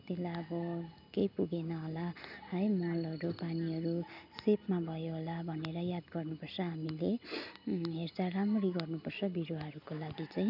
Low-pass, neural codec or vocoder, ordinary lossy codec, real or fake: 5.4 kHz; none; none; real